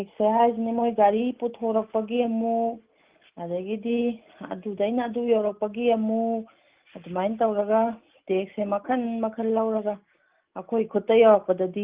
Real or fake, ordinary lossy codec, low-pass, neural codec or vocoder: real; Opus, 32 kbps; 3.6 kHz; none